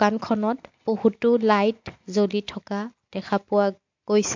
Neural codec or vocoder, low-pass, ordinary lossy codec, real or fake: none; 7.2 kHz; MP3, 48 kbps; real